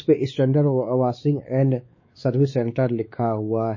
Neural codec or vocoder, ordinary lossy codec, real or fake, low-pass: codec, 16 kHz, 4 kbps, X-Codec, WavLM features, trained on Multilingual LibriSpeech; MP3, 32 kbps; fake; 7.2 kHz